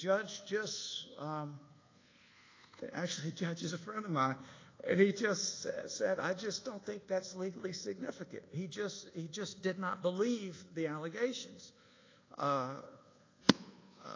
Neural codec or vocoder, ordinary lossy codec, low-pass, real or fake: codec, 24 kHz, 1.2 kbps, DualCodec; AAC, 32 kbps; 7.2 kHz; fake